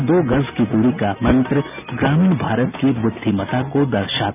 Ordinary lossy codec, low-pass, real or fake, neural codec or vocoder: none; 3.6 kHz; real; none